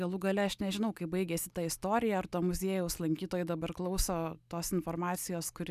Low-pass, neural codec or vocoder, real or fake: 14.4 kHz; none; real